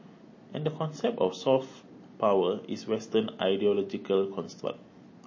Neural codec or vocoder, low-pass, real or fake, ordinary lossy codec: none; 7.2 kHz; real; MP3, 32 kbps